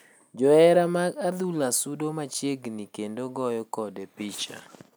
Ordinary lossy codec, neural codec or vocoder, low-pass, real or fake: none; none; none; real